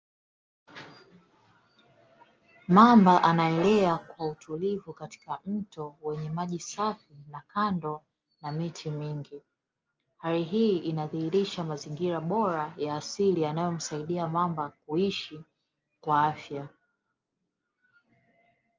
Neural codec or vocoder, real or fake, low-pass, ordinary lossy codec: none; real; 7.2 kHz; Opus, 24 kbps